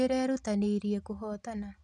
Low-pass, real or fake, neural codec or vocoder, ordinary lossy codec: none; real; none; none